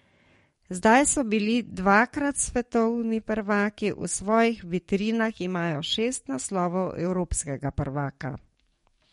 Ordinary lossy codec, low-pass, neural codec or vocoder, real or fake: MP3, 48 kbps; 19.8 kHz; codec, 44.1 kHz, 7.8 kbps, DAC; fake